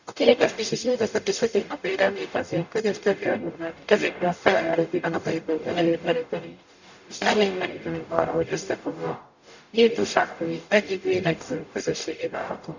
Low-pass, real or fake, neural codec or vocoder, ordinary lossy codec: 7.2 kHz; fake; codec, 44.1 kHz, 0.9 kbps, DAC; none